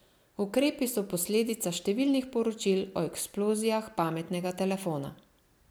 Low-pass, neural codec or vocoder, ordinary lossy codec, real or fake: none; none; none; real